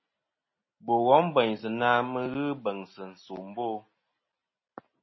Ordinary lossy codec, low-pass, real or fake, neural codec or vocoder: MP3, 24 kbps; 7.2 kHz; real; none